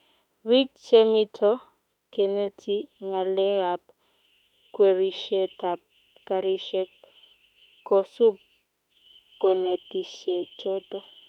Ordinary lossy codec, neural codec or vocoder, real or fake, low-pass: none; autoencoder, 48 kHz, 32 numbers a frame, DAC-VAE, trained on Japanese speech; fake; 19.8 kHz